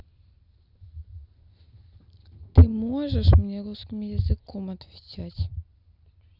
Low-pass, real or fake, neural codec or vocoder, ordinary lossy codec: 5.4 kHz; real; none; none